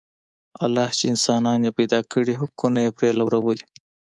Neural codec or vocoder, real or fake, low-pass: codec, 24 kHz, 3.1 kbps, DualCodec; fake; 10.8 kHz